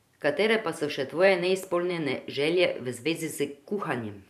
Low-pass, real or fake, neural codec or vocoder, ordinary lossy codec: 14.4 kHz; fake; vocoder, 44.1 kHz, 128 mel bands every 512 samples, BigVGAN v2; none